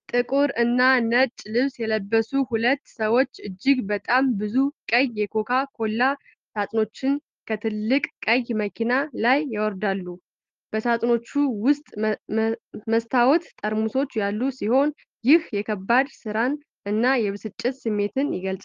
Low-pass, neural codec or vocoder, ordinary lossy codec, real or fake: 7.2 kHz; none; Opus, 32 kbps; real